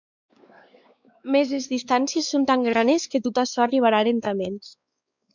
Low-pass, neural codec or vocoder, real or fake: 7.2 kHz; codec, 16 kHz, 4 kbps, X-Codec, WavLM features, trained on Multilingual LibriSpeech; fake